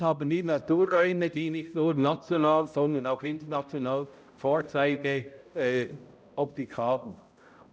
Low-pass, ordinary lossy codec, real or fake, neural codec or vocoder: none; none; fake; codec, 16 kHz, 0.5 kbps, X-Codec, HuBERT features, trained on balanced general audio